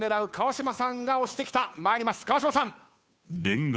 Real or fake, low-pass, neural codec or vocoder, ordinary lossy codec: fake; none; codec, 16 kHz, 2 kbps, FunCodec, trained on Chinese and English, 25 frames a second; none